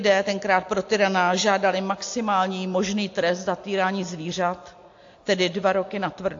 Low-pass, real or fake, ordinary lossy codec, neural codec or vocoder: 7.2 kHz; real; AAC, 48 kbps; none